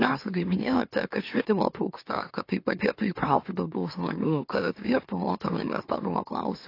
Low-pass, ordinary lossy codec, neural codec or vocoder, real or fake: 5.4 kHz; AAC, 32 kbps; autoencoder, 44.1 kHz, a latent of 192 numbers a frame, MeloTTS; fake